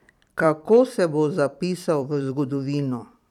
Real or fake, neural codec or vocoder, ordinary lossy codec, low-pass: fake; vocoder, 44.1 kHz, 128 mel bands every 256 samples, BigVGAN v2; none; 19.8 kHz